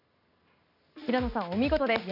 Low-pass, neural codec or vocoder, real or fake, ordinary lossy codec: 5.4 kHz; none; real; none